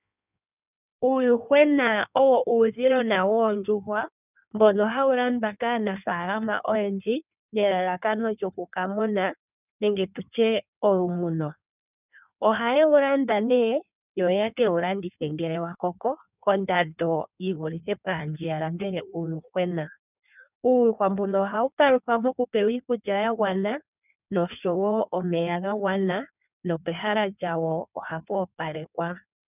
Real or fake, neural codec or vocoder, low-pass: fake; codec, 16 kHz in and 24 kHz out, 1.1 kbps, FireRedTTS-2 codec; 3.6 kHz